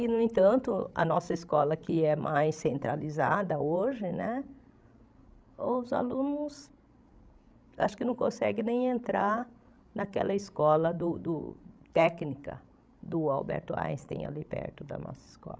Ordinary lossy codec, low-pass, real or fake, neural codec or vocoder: none; none; fake; codec, 16 kHz, 16 kbps, FreqCodec, larger model